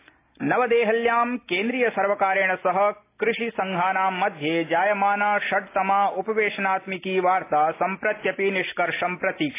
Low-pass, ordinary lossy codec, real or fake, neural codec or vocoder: 3.6 kHz; AAC, 24 kbps; real; none